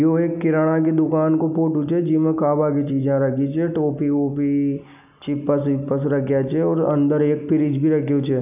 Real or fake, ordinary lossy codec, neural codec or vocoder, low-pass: real; none; none; 3.6 kHz